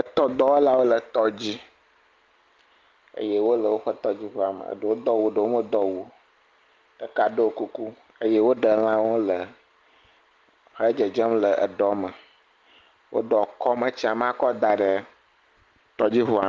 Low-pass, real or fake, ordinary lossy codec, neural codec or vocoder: 7.2 kHz; real; Opus, 32 kbps; none